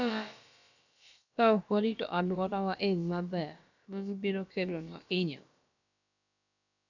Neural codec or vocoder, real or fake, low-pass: codec, 16 kHz, about 1 kbps, DyCAST, with the encoder's durations; fake; 7.2 kHz